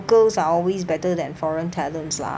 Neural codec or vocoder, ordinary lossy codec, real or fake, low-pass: none; none; real; none